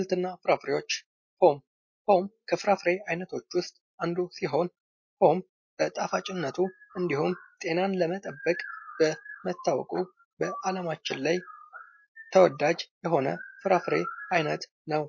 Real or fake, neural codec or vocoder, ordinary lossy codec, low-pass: real; none; MP3, 32 kbps; 7.2 kHz